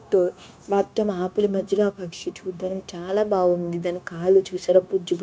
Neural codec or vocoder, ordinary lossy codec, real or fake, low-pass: codec, 16 kHz, 0.9 kbps, LongCat-Audio-Codec; none; fake; none